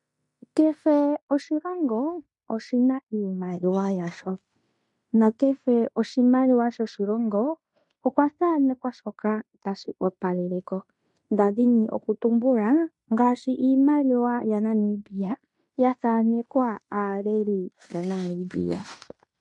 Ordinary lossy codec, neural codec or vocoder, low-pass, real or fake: MP3, 64 kbps; codec, 16 kHz in and 24 kHz out, 0.9 kbps, LongCat-Audio-Codec, fine tuned four codebook decoder; 10.8 kHz; fake